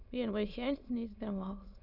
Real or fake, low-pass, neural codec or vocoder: fake; 5.4 kHz; autoencoder, 22.05 kHz, a latent of 192 numbers a frame, VITS, trained on many speakers